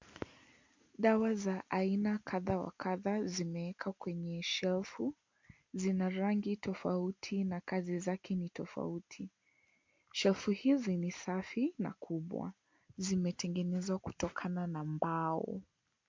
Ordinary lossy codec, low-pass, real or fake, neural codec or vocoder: MP3, 48 kbps; 7.2 kHz; real; none